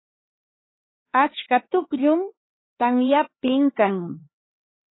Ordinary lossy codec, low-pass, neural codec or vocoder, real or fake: AAC, 16 kbps; 7.2 kHz; codec, 16 kHz, 4 kbps, X-Codec, HuBERT features, trained on LibriSpeech; fake